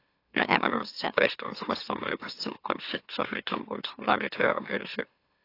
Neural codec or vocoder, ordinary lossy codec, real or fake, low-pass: autoencoder, 44.1 kHz, a latent of 192 numbers a frame, MeloTTS; AAC, 32 kbps; fake; 5.4 kHz